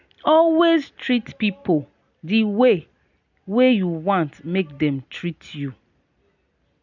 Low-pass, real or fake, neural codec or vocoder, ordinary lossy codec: 7.2 kHz; real; none; none